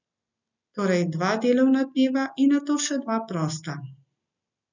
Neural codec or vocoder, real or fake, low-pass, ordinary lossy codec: none; real; 7.2 kHz; none